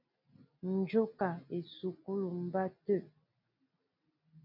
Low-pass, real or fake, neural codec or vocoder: 5.4 kHz; real; none